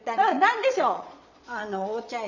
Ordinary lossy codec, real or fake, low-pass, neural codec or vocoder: none; fake; 7.2 kHz; vocoder, 22.05 kHz, 80 mel bands, Vocos